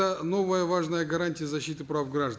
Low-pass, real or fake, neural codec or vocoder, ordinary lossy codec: none; real; none; none